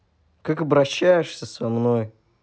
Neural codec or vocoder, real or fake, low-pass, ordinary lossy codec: none; real; none; none